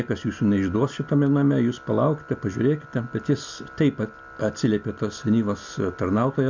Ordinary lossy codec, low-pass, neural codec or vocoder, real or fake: MP3, 64 kbps; 7.2 kHz; none; real